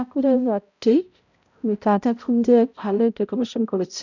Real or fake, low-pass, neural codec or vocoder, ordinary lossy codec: fake; 7.2 kHz; codec, 16 kHz, 0.5 kbps, X-Codec, HuBERT features, trained on balanced general audio; none